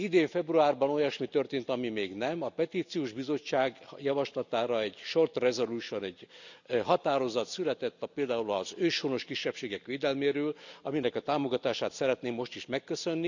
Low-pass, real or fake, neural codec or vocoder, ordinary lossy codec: 7.2 kHz; real; none; none